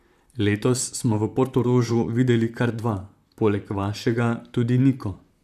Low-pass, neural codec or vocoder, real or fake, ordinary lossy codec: 14.4 kHz; vocoder, 44.1 kHz, 128 mel bands, Pupu-Vocoder; fake; none